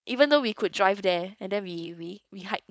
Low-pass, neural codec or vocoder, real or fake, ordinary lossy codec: none; codec, 16 kHz, 4.8 kbps, FACodec; fake; none